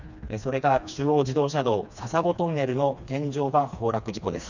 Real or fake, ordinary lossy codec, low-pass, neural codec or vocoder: fake; none; 7.2 kHz; codec, 16 kHz, 2 kbps, FreqCodec, smaller model